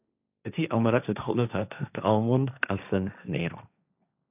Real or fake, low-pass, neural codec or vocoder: fake; 3.6 kHz; codec, 16 kHz, 1.1 kbps, Voila-Tokenizer